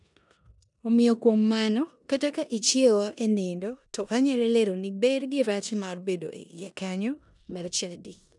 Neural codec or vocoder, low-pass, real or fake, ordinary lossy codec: codec, 16 kHz in and 24 kHz out, 0.9 kbps, LongCat-Audio-Codec, four codebook decoder; 10.8 kHz; fake; none